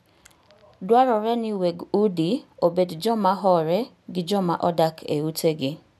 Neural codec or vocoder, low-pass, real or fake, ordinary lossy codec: none; 14.4 kHz; real; none